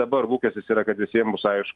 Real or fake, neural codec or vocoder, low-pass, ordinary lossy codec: real; none; 10.8 kHz; Opus, 32 kbps